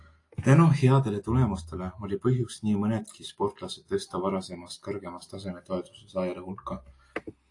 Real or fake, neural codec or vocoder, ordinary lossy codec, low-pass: real; none; AAC, 48 kbps; 10.8 kHz